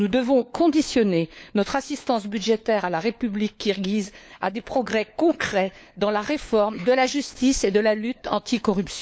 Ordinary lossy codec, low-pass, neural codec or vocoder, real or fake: none; none; codec, 16 kHz, 4 kbps, FunCodec, trained on LibriTTS, 50 frames a second; fake